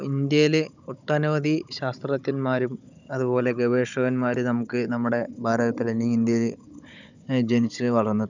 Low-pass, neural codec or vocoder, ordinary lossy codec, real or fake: 7.2 kHz; codec, 16 kHz, 16 kbps, FunCodec, trained on Chinese and English, 50 frames a second; none; fake